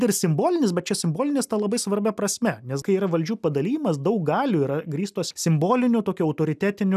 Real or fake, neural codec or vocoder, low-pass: real; none; 14.4 kHz